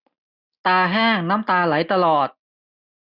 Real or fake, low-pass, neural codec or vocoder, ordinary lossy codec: real; 5.4 kHz; none; none